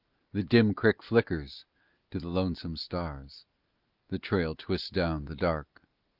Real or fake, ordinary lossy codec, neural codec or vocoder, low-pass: real; Opus, 32 kbps; none; 5.4 kHz